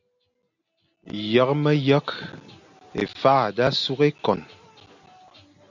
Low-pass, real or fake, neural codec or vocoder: 7.2 kHz; real; none